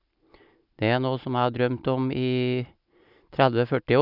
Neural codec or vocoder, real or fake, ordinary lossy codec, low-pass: none; real; none; 5.4 kHz